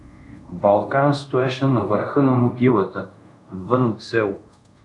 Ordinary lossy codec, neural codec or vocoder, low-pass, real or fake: AAC, 64 kbps; codec, 24 kHz, 0.5 kbps, DualCodec; 10.8 kHz; fake